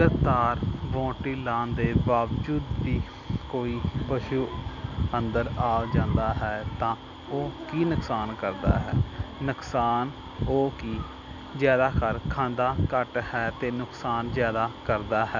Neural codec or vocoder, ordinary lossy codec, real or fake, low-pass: none; none; real; 7.2 kHz